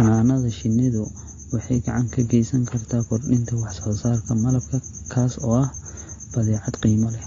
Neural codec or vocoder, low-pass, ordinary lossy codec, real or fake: none; 7.2 kHz; AAC, 32 kbps; real